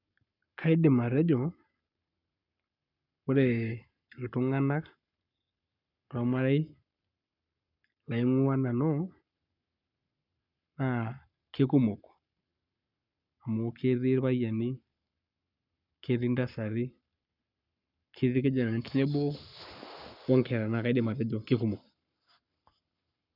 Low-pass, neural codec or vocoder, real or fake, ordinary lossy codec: 5.4 kHz; codec, 44.1 kHz, 7.8 kbps, Pupu-Codec; fake; none